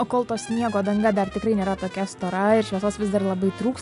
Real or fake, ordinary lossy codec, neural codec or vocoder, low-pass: real; AAC, 96 kbps; none; 10.8 kHz